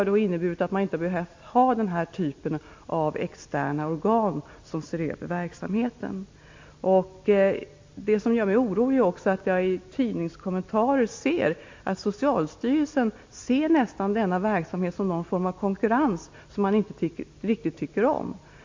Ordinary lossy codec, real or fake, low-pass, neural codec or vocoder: MP3, 48 kbps; real; 7.2 kHz; none